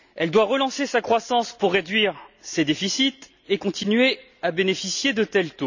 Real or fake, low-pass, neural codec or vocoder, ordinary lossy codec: real; 7.2 kHz; none; none